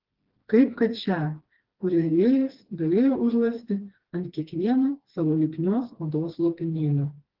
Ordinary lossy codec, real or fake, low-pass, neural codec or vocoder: Opus, 16 kbps; fake; 5.4 kHz; codec, 16 kHz, 2 kbps, FreqCodec, smaller model